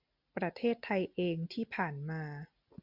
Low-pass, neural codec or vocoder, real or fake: 5.4 kHz; none; real